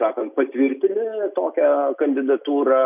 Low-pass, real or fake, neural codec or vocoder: 3.6 kHz; real; none